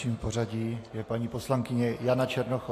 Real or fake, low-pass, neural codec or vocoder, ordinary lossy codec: real; 14.4 kHz; none; AAC, 48 kbps